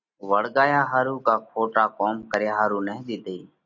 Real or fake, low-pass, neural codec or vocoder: real; 7.2 kHz; none